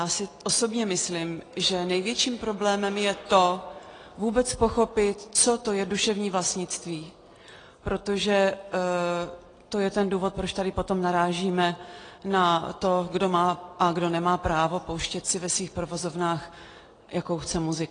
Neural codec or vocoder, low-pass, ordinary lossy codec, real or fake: none; 9.9 kHz; AAC, 32 kbps; real